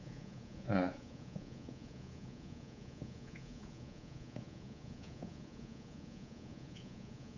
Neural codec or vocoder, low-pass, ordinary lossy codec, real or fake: codec, 24 kHz, 3.1 kbps, DualCodec; 7.2 kHz; none; fake